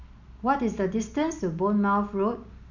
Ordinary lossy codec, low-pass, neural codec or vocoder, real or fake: none; 7.2 kHz; none; real